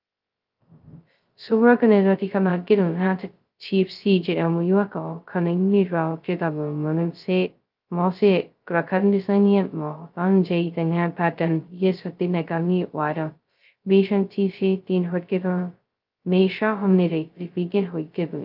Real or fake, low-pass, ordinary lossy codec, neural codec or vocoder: fake; 5.4 kHz; Opus, 24 kbps; codec, 16 kHz, 0.2 kbps, FocalCodec